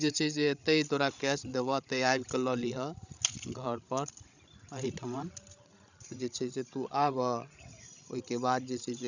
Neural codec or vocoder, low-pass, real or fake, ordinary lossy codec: codec, 16 kHz, 16 kbps, FreqCodec, larger model; 7.2 kHz; fake; none